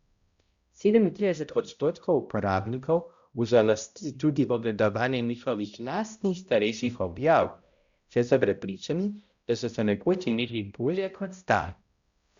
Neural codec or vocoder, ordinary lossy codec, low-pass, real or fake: codec, 16 kHz, 0.5 kbps, X-Codec, HuBERT features, trained on balanced general audio; Opus, 64 kbps; 7.2 kHz; fake